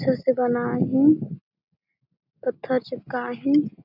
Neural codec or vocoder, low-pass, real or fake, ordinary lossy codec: none; 5.4 kHz; real; none